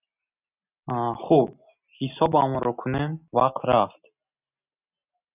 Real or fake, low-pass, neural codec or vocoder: real; 3.6 kHz; none